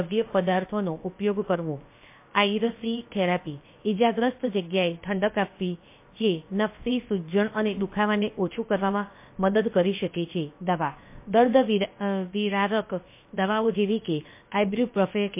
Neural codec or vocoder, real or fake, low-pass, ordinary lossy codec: codec, 16 kHz, about 1 kbps, DyCAST, with the encoder's durations; fake; 3.6 kHz; MP3, 24 kbps